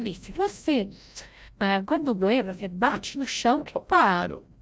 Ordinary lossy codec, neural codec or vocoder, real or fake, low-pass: none; codec, 16 kHz, 0.5 kbps, FreqCodec, larger model; fake; none